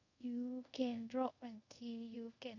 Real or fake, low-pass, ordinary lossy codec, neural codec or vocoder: fake; 7.2 kHz; none; codec, 24 kHz, 0.5 kbps, DualCodec